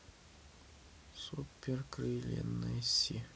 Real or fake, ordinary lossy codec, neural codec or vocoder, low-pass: real; none; none; none